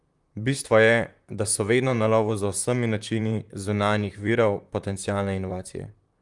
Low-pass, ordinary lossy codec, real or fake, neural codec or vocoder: 10.8 kHz; Opus, 32 kbps; fake; vocoder, 44.1 kHz, 128 mel bands, Pupu-Vocoder